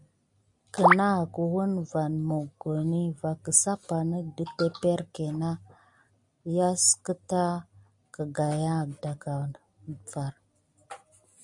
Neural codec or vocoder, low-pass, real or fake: none; 10.8 kHz; real